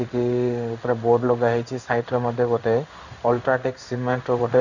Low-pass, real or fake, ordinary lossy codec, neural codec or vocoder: 7.2 kHz; fake; none; codec, 16 kHz in and 24 kHz out, 1 kbps, XY-Tokenizer